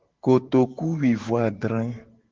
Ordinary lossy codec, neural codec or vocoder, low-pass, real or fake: Opus, 16 kbps; vocoder, 44.1 kHz, 128 mel bands every 512 samples, BigVGAN v2; 7.2 kHz; fake